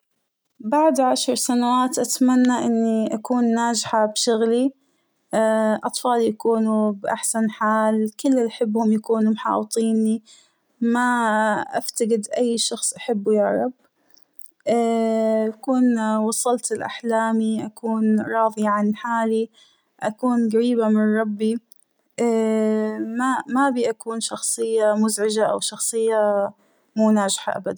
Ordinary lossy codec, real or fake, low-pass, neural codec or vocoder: none; real; none; none